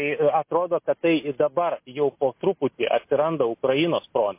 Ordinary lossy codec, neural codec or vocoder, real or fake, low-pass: MP3, 24 kbps; none; real; 3.6 kHz